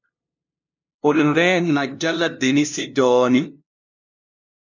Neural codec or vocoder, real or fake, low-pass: codec, 16 kHz, 0.5 kbps, FunCodec, trained on LibriTTS, 25 frames a second; fake; 7.2 kHz